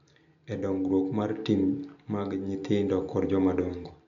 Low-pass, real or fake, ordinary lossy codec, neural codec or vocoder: 7.2 kHz; real; none; none